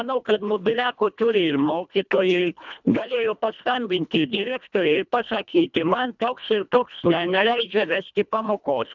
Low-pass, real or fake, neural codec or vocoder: 7.2 kHz; fake; codec, 24 kHz, 1.5 kbps, HILCodec